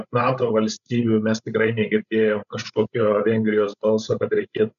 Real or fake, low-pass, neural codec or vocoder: fake; 7.2 kHz; codec, 16 kHz, 16 kbps, FreqCodec, larger model